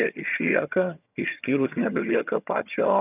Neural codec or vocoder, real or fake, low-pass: vocoder, 22.05 kHz, 80 mel bands, HiFi-GAN; fake; 3.6 kHz